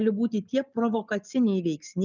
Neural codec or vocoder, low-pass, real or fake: none; 7.2 kHz; real